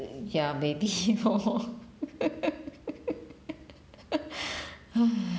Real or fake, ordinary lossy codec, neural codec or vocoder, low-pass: real; none; none; none